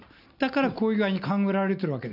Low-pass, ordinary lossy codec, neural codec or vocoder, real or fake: 5.4 kHz; none; none; real